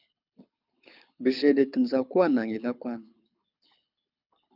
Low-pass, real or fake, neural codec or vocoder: 5.4 kHz; fake; codec, 24 kHz, 6 kbps, HILCodec